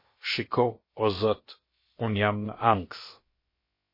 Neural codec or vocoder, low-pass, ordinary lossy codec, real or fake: codec, 16 kHz, about 1 kbps, DyCAST, with the encoder's durations; 5.4 kHz; MP3, 24 kbps; fake